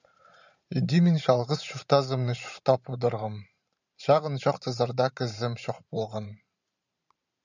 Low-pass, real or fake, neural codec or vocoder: 7.2 kHz; fake; vocoder, 44.1 kHz, 128 mel bands every 512 samples, BigVGAN v2